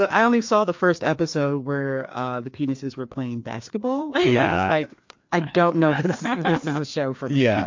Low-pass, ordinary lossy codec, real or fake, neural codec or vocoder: 7.2 kHz; MP3, 48 kbps; fake; codec, 16 kHz, 2 kbps, FreqCodec, larger model